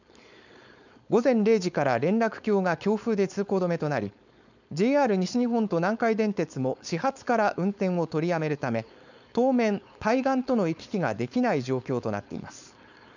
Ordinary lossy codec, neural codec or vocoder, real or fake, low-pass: none; codec, 16 kHz, 4.8 kbps, FACodec; fake; 7.2 kHz